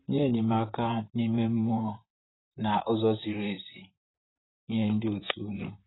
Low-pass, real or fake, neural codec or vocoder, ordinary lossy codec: 7.2 kHz; fake; vocoder, 44.1 kHz, 128 mel bands, Pupu-Vocoder; AAC, 16 kbps